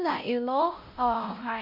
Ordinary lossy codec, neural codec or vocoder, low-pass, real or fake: MP3, 48 kbps; codec, 16 kHz, 0.5 kbps, X-Codec, WavLM features, trained on Multilingual LibriSpeech; 5.4 kHz; fake